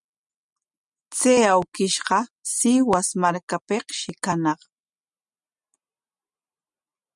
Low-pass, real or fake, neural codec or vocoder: 10.8 kHz; real; none